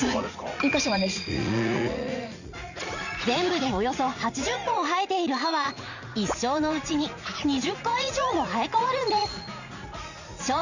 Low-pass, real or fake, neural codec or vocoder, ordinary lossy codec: 7.2 kHz; fake; vocoder, 44.1 kHz, 80 mel bands, Vocos; none